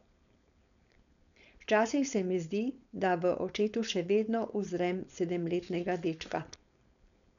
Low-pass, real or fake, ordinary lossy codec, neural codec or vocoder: 7.2 kHz; fake; none; codec, 16 kHz, 4.8 kbps, FACodec